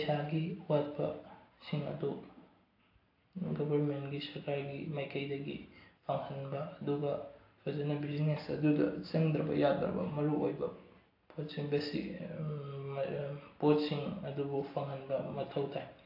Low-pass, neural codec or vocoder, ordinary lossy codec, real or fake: 5.4 kHz; none; AAC, 32 kbps; real